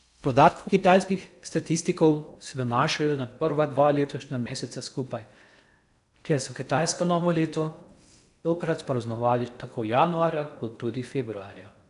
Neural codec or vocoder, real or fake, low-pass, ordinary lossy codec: codec, 16 kHz in and 24 kHz out, 0.6 kbps, FocalCodec, streaming, 4096 codes; fake; 10.8 kHz; none